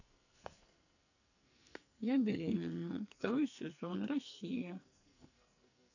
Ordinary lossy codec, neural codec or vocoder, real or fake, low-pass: none; codec, 44.1 kHz, 2.6 kbps, SNAC; fake; 7.2 kHz